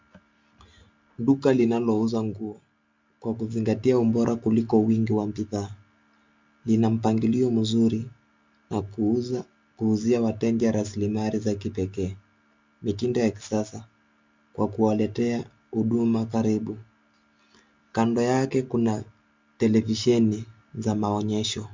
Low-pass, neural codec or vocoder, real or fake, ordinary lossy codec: 7.2 kHz; none; real; MP3, 64 kbps